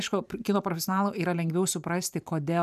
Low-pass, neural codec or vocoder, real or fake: 14.4 kHz; vocoder, 44.1 kHz, 128 mel bands every 512 samples, BigVGAN v2; fake